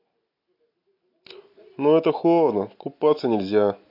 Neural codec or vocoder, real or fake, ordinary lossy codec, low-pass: none; real; MP3, 32 kbps; 5.4 kHz